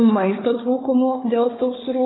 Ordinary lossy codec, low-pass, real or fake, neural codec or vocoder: AAC, 16 kbps; 7.2 kHz; fake; codec, 16 kHz, 4 kbps, FunCodec, trained on Chinese and English, 50 frames a second